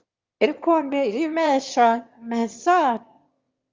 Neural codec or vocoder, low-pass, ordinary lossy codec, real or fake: autoencoder, 22.05 kHz, a latent of 192 numbers a frame, VITS, trained on one speaker; 7.2 kHz; Opus, 32 kbps; fake